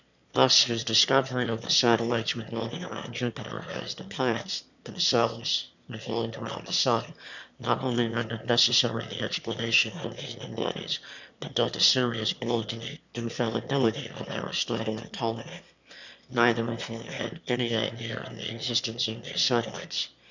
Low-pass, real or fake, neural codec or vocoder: 7.2 kHz; fake; autoencoder, 22.05 kHz, a latent of 192 numbers a frame, VITS, trained on one speaker